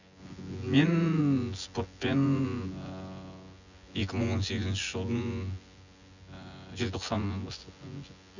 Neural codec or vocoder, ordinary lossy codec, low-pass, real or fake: vocoder, 24 kHz, 100 mel bands, Vocos; none; 7.2 kHz; fake